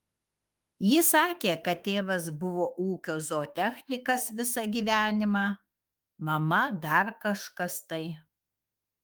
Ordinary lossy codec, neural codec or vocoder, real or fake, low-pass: Opus, 32 kbps; autoencoder, 48 kHz, 32 numbers a frame, DAC-VAE, trained on Japanese speech; fake; 19.8 kHz